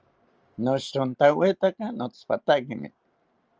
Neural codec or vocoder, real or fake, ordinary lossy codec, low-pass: none; real; Opus, 24 kbps; 7.2 kHz